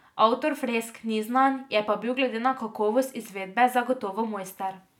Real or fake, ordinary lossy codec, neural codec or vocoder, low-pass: real; none; none; 19.8 kHz